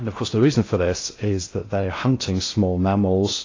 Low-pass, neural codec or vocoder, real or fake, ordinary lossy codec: 7.2 kHz; codec, 16 kHz in and 24 kHz out, 0.6 kbps, FocalCodec, streaming, 2048 codes; fake; AAC, 32 kbps